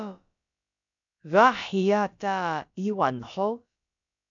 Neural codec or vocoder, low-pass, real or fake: codec, 16 kHz, about 1 kbps, DyCAST, with the encoder's durations; 7.2 kHz; fake